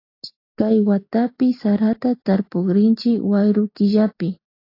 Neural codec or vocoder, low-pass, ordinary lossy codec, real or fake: none; 5.4 kHz; AAC, 32 kbps; real